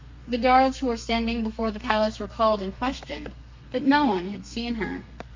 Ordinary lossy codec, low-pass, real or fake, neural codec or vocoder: MP3, 48 kbps; 7.2 kHz; fake; codec, 32 kHz, 1.9 kbps, SNAC